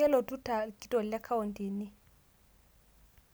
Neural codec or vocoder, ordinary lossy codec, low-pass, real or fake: none; none; none; real